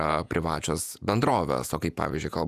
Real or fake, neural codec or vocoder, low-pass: fake; vocoder, 48 kHz, 128 mel bands, Vocos; 14.4 kHz